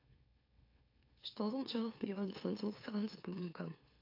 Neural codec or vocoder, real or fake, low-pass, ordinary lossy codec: autoencoder, 44.1 kHz, a latent of 192 numbers a frame, MeloTTS; fake; 5.4 kHz; AAC, 32 kbps